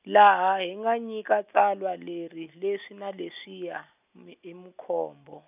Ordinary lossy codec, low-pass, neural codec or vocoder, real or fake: none; 3.6 kHz; none; real